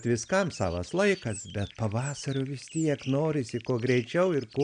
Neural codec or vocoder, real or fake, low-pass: none; real; 9.9 kHz